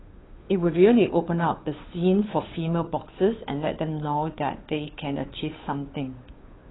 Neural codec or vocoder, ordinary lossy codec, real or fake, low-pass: codec, 16 kHz, 2 kbps, FunCodec, trained on Chinese and English, 25 frames a second; AAC, 16 kbps; fake; 7.2 kHz